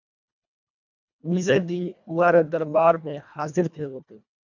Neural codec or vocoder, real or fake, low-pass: codec, 24 kHz, 1.5 kbps, HILCodec; fake; 7.2 kHz